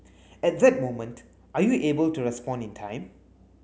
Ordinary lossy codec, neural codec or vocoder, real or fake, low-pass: none; none; real; none